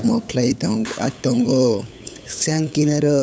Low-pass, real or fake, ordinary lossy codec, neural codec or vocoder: none; fake; none; codec, 16 kHz, 8 kbps, FunCodec, trained on LibriTTS, 25 frames a second